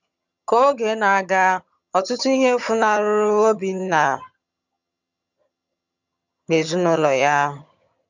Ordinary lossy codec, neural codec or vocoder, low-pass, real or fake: none; vocoder, 22.05 kHz, 80 mel bands, HiFi-GAN; 7.2 kHz; fake